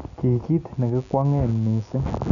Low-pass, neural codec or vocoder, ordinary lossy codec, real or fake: 7.2 kHz; none; none; real